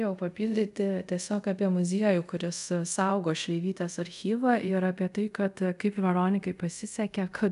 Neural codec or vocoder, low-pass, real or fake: codec, 24 kHz, 0.5 kbps, DualCodec; 10.8 kHz; fake